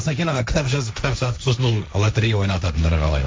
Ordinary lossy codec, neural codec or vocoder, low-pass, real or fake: none; codec, 16 kHz, 1.1 kbps, Voila-Tokenizer; none; fake